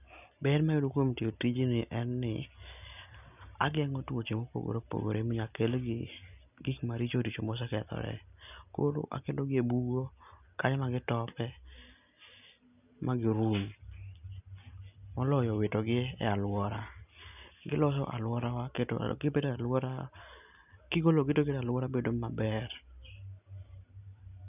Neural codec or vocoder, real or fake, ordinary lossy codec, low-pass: none; real; none; 3.6 kHz